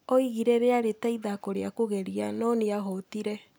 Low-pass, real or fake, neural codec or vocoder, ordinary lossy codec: none; real; none; none